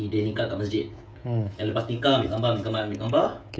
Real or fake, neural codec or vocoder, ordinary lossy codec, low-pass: fake; codec, 16 kHz, 16 kbps, FreqCodec, smaller model; none; none